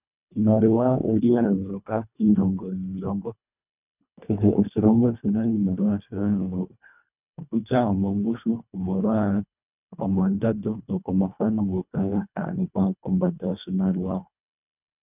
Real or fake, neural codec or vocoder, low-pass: fake; codec, 24 kHz, 1.5 kbps, HILCodec; 3.6 kHz